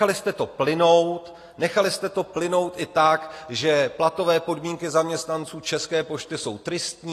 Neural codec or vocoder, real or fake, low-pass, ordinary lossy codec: none; real; 14.4 kHz; AAC, 48 kbps